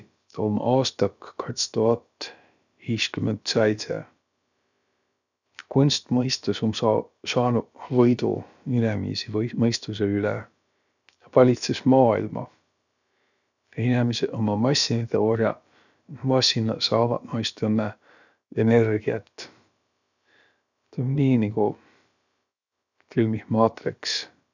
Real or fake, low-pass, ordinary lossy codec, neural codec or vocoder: fake; 7.2 kHz; none; codec, 16 kHz, about 1 kbps, DyCAST, with the encoder's durations